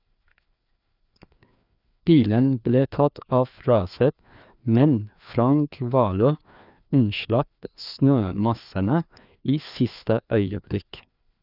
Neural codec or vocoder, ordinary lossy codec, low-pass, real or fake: codec, 16 kHz, 2 kbps, FreqCodec, larger model; none; 5.4 kHz; fake